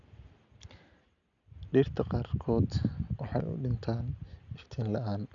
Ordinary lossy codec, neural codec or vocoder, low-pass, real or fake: none; none; 7.2 kHz; real